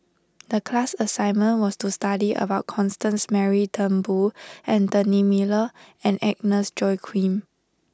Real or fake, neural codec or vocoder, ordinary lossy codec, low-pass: real; none; none; none